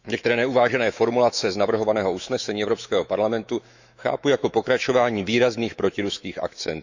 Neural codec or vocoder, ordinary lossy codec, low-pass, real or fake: autoencoder, 48 kHz, 128 numbers a frame, DAC-VAE, trained on Japanese speech; Opus, 64 kbps; 7.2 kHz; fake